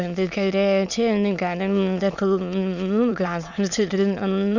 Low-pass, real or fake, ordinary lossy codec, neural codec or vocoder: 7.2 kHz; fake; none; autoencoder, 22.05 kHz, a latent of 192 numbers a frame, VITS, trained on many speakers